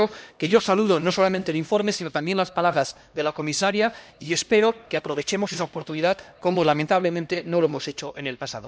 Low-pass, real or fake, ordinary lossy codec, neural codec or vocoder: none; fake; none; codec, 16 kHz, 1 kbps, X-Codec, HuBERT features, trained on LibriSpeech